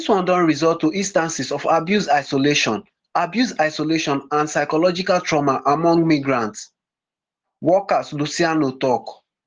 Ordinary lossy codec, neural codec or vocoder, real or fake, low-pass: Opus, 16 kbps; none; real; 7.2 kHz